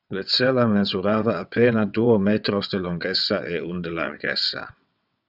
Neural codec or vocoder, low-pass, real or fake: vocoder, 22.05 kHz, 80 mel bands, WaveNeXt; 5.4 kHz; fake